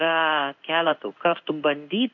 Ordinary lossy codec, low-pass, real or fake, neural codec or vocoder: MP3, 64 kbps; 7.2 kHz; fake; codec, 16 kHz in and 24 kHz out, 1 kbps, XY-Tokenizer